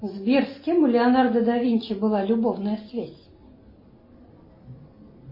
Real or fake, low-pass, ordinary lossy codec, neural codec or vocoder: real; 5.4 kHz; MP3, 24 kbps; none